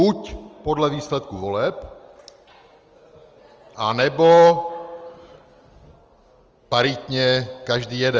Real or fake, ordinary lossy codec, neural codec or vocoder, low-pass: real; Opus, 24 kbps; none; 7.2 kHz